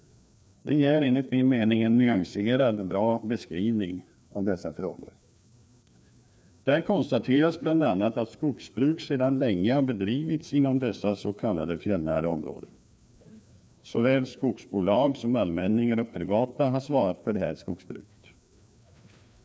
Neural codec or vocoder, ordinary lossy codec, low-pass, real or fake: codec, 16 kHz, 2 kbps, FreqCodec, larger model; none; none; fake